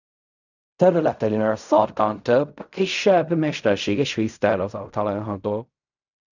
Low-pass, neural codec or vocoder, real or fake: 7.2 kHz; codec, 16 kHz in and 24 kHz out, 0.4 kbps, LongCat-Audio-Codec, fine tuned four codebook decoder; fake